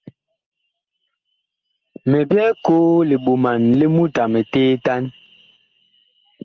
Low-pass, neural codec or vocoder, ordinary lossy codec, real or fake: 7.2 kHz; none; Opus, 16 kbps; real